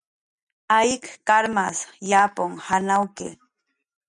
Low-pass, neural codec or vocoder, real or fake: 10.8 kHz; none; real